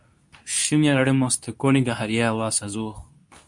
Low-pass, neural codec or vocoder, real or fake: 10.8 kHz; codec, 24 kHz, 0.9 kbps, WavTokenizer, medium speech release version 2; fake